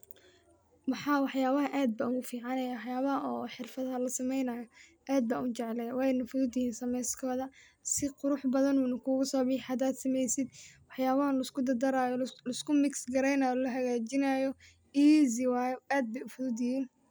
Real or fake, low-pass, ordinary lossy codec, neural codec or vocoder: real; none; none; none